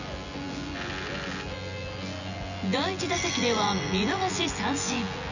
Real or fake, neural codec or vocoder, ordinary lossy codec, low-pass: fake; vocoder, 24 kHz, 100 mel bands, Vocos; none; 7.2 kHz